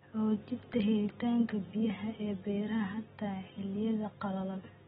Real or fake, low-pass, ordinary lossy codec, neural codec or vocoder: real; 7.2 kHz; AAC, 16 kbps; none